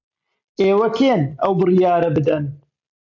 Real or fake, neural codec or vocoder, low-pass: real; none; 7.2 kHz